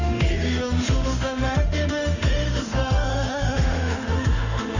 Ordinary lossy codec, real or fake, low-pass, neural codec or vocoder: none; fake; 7.2 kHz; autoencoder, 48 kHz, 32 numbers a frame, DAC-VAE, trained on Japanese speech